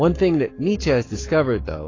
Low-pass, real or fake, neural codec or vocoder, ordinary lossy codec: 7.2 kHz; fake; codec, 44.1 kHz, 7.8 kbps, Pupu-Codec; AAC, 32 kbps